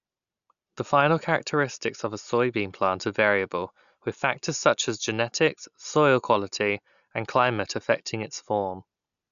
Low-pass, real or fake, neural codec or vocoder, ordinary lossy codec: 7.2 kHz; real; none; none